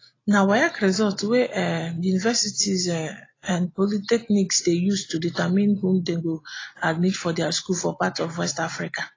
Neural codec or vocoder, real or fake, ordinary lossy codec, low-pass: none; real; AAC, 32 kbps; 7.2 kHz